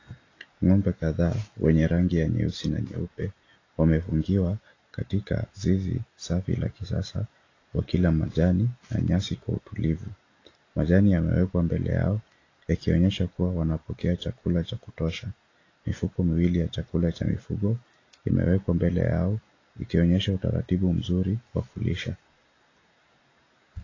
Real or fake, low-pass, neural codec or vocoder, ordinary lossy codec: real; 7.2 kHz; none; AAC, 32 kbps